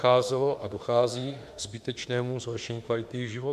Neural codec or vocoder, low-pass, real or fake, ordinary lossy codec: autoencoder, 48 kHz, 32 numbers a frame, DAC-VAE, trained on Japanese speech; 14.4 kHz; fake; AAC, 96 kbps